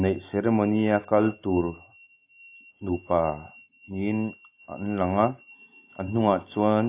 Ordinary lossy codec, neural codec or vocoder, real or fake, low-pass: AAC, 24 kbps; none; real; 3.6 kHz